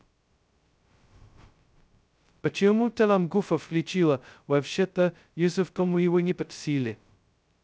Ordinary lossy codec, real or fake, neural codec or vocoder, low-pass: none; fake; codec, 16 kHz, 0.2 kbps, FocalCodec; none